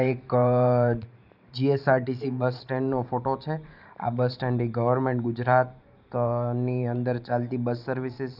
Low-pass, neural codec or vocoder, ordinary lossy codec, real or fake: 5.4 kHz; vocoder, 44.1 kHz, 128 mel bands every 512 samples, BigVGAN v2; none; fake